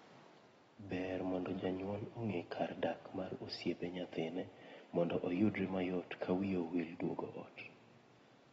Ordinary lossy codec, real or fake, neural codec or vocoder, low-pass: AAC, 24 kbps; real; none; 10.8 kHz